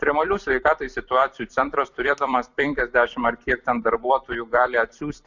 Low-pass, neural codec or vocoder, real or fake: 7.2 kHz; vocoder, 44.1 kHz, 128 mel bands every 256 samples, BigVGAN v2; fake